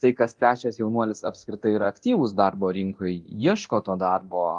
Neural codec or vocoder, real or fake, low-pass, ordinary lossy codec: codec, 24 kHz, 0.9 kbps, DualCodec; fake; 10.8 kHz; Opus, 32 kbps